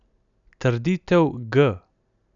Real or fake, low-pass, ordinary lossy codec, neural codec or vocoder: real; 7.2 kHz; none; none